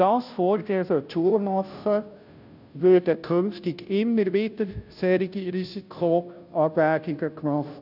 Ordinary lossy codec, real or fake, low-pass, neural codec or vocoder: none; fake; 5.4 kHz; codec, 16 kHz, 0.5 kbps, FunCodec, trained on Chinese and English, 25 frames a second